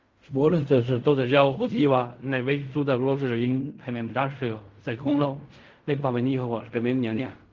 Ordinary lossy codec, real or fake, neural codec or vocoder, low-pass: Opus, 32 kbps; fake; codec, 16 kHz in and 24 kHz out, 0.4 kbps, LongCat-Audio-Codec, fine tuned four codebook decoder; 7.2 kHz